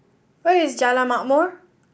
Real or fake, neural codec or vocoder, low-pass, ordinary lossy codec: real; none; none; none